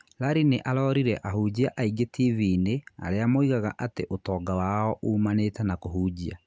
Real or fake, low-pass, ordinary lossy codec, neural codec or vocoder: real; none; none; none